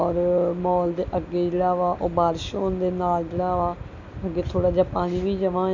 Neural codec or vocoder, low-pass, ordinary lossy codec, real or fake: none; 7.2 kHz; MP3, 48 kbps; real